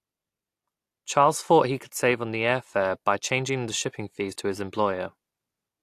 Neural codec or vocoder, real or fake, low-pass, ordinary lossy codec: none; real; 14.4 kHz; AAC, 64 kbps